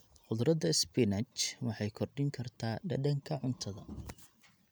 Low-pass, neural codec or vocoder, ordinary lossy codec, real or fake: none; none; none; real